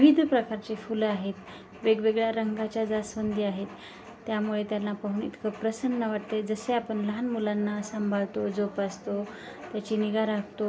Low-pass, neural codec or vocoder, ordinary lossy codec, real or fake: none; none; none; real